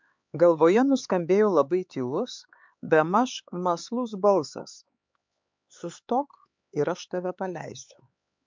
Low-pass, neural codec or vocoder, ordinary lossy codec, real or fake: 7.2 kHz; codec, 16 kHz, 4 kbps, X-Codec, HuBERT features, trained on LibriSpeech; MP3, 64 kbps; fake